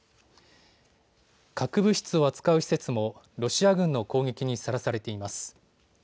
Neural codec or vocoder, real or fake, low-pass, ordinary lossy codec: none; real; none; none